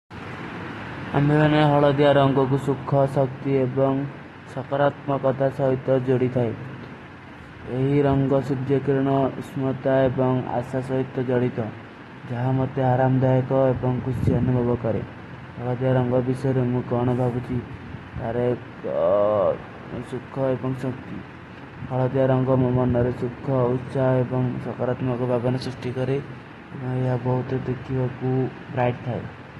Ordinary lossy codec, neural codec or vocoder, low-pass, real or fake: AAC, 32 kbps; none; 14.4 kHz; real